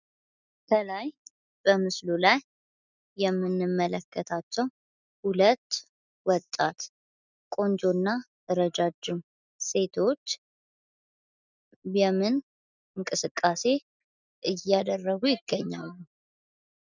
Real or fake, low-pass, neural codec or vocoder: real; 7.2 kHz; none